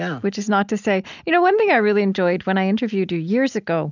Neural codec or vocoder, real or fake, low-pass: none; real; 7.2 kHz